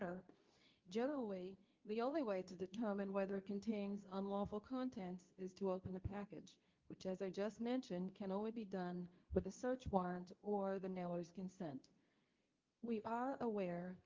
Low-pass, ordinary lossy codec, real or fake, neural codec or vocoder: 7.2 kHz; Opus, 32 kbps; fake; codec, 24 kHz, 0.9 kbps, WavTokenizer, medium speech release version 2